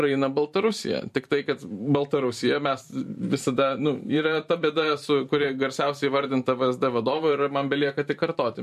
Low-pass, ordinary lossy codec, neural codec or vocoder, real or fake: 14.4 kHz; MP3, 64 kbps; vocoder, 44.1 kHz, 128 mel bands every 512 samples, BigVGAN v2; fake